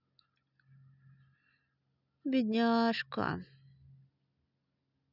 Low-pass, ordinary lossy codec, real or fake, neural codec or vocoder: 5.4 kHz; none; real; none